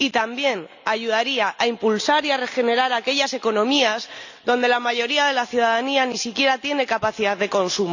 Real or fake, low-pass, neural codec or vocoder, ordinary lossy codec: real; 7.2 kHz; none; none